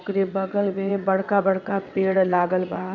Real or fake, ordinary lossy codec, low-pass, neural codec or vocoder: fake; none; 7.2 kHz; vocoder, 22.05 kHz, 80 mel bands, WaveNeXt